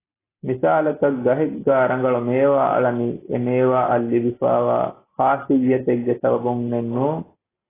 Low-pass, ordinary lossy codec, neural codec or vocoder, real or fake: 3.6 kHz; AAC, 16 kbps; none; real